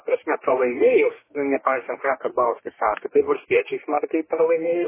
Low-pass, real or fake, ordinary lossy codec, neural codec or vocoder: 3.6 kHz; fake; MP3, 16 kbps; codec, 44.1 kHz, 2.6 kbps, DAC